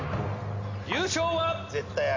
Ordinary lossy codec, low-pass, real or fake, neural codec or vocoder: MP3, 48 kbps; 7.2 kHz; real; none